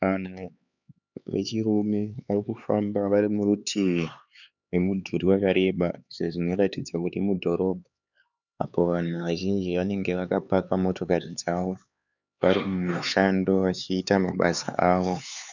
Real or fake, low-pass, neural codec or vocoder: fake; 7.2 kHz; codec, 16 kHz, 4 kbps, X-Codec, HuBERT features, trained on LibriSpeech